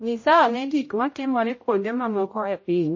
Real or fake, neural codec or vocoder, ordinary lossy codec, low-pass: fake; codec, 16 kHz, 0.5 kbps, X-Codec, HuBERT features, trained on general audio; MP3, 32 kbps; 7.2 kHz